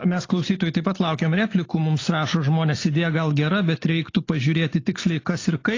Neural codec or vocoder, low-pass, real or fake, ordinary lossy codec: none; 7.2 kHz; real; AAC, 32 kbps